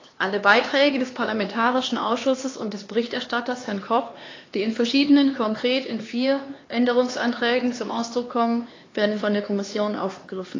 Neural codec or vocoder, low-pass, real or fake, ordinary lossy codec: codec, 16 kHz, 2 kbps, X-Codec, HuBERT features, trained on LibriSpeech; 7.2 kHz; fake; AAC, 32 kbps